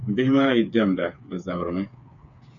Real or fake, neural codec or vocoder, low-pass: fake; codec, 16 kHz, 8 kbps, FreqCodec, smaller model; 7.2 kHz